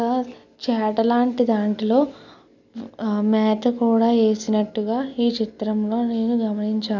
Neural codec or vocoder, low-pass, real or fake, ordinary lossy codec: none; 7.2 kHz; real; none